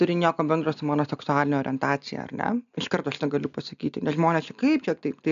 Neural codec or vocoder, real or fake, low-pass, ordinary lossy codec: none; real; 7.2 kHz; MP3, 96 kbps